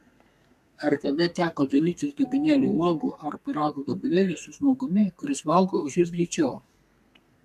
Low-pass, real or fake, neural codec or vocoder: 14.4 kHz; fake; codec, 32 kHz, 1.9 kbps, SNAC